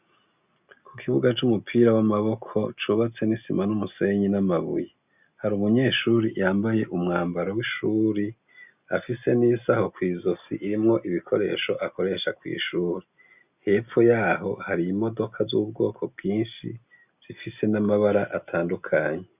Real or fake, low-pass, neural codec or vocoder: real; 3.6 kHz; none